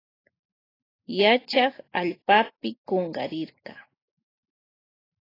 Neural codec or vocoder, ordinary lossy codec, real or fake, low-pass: none; AAC, 24 kbps; real; 5.4 kHz